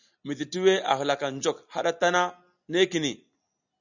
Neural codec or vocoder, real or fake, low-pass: none; real; 7.2 kHz